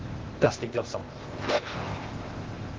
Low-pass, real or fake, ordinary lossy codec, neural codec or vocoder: 7.2 kHz; fake; Opus, 16 kbps; codec, 16 kHz, 0.8 kbps, ZipCodec